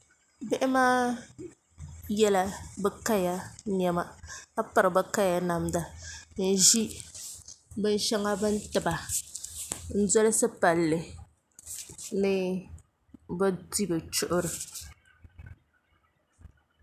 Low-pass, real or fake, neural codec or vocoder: 14.4 kHz; real; none